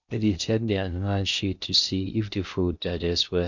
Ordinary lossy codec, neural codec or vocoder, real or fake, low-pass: none; codec, 16 kHz in and 24 kHz out, 0.6 kbps, FocalCodec, streaming, 2048 codes; fake; 7.2 kHz